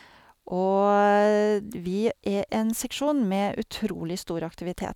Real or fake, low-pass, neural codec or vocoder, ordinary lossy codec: real; 19.8 kHz; none; none